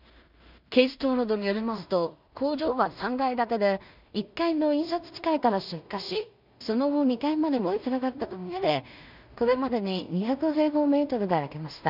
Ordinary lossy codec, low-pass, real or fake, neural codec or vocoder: none; 5.4 kHz; fake; codec, 16 kHz in and 24 kHz out, 0.4 kbps, LongCat-Audio-Codec, two codebook decoder